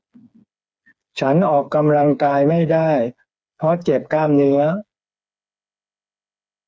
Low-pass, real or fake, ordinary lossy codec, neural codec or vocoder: none; fake; none; codec, 16 kHz, 4 kbps, FreqCodec, smaller model